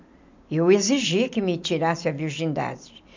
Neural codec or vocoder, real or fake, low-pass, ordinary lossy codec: vocoder, 44.1 kHz, 128 mel bands every 256 samples, BigVGAN v2; fake; 7.2 kHz; MP3, 64 kbps